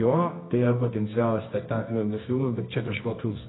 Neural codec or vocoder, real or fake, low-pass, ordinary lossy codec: codec, 24 kHz, 0.9 kbps, WavTokenizer, medium music audio release; fake; 7.2 kHz; AAC, 16 kbps